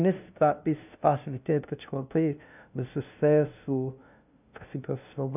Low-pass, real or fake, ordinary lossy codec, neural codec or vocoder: 3.6 kHz; fake; none; codec, 16 kHz, 0.5 kbps, FunCodec, trained on LibriTTS, 25 frames a second